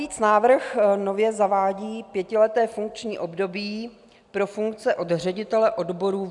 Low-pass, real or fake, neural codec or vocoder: 10.8 kHz; real; none